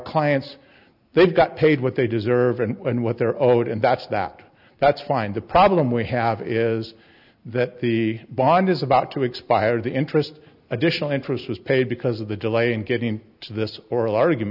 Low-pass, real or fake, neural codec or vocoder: 5.4 kHz; real; none